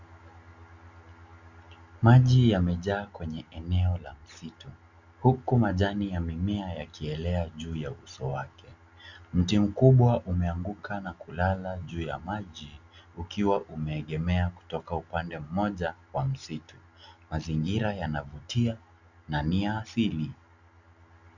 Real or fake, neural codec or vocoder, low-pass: real; none; 7.2 kHz